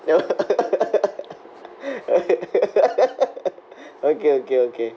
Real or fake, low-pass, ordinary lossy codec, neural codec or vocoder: real; none; none; none